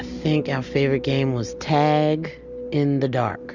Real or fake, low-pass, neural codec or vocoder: real; 7.2 kHz; none